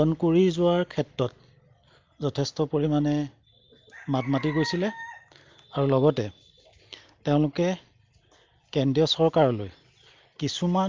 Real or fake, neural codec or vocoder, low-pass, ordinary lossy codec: real; none; 7.2 kHz; Opus, 24 kbps